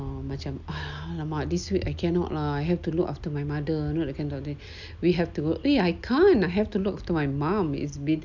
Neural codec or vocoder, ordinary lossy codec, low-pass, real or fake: none; none; 7.2 kHz; real